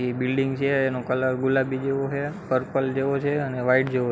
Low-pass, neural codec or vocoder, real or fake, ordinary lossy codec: none; none; real; none